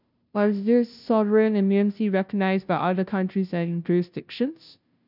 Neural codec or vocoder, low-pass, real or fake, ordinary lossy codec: codec, 16 kHz, 0.5 kbps, FunCodec, trained on Chinese and English, 25 frames a second; 5.4 kHz; fake; none